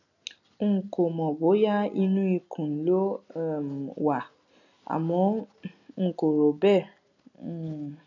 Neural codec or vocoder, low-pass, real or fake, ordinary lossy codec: none; 7.2 kHz; real; none